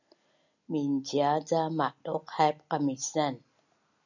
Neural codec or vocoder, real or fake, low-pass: none; real; 7.2 kHz